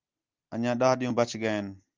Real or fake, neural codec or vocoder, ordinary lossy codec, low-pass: real; none; Opus, 32 kbps; 7.2 kHz